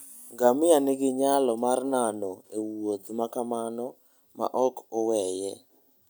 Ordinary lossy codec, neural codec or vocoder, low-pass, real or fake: none; none; none; real